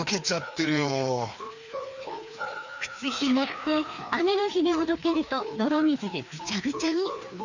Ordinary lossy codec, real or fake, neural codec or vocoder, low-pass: none; fake; codec, 16 kHz, 2 kbps, FreqCodec, larger model; 7.2 kHz